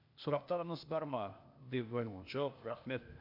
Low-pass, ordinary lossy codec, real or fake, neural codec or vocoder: 5.4 kHz; MP3, 48 kbps; fake; codec, 16 kHz, 0.8 kbps, ZipCodec